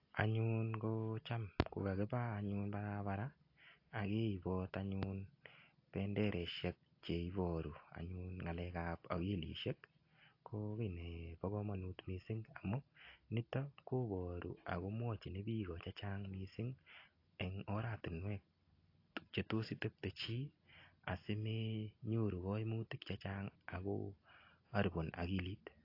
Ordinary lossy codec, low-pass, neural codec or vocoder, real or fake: AAC, 32 kbps; 5.4 kHz; none; real